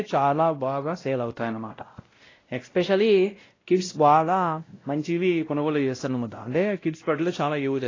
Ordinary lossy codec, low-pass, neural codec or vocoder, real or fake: AAC, 32 kbps; 7.2 kHz; codec, 16 kHz, 0.5 kbps, X-Codec, WavLM features, trained on Multilingual LibriSpeech; fake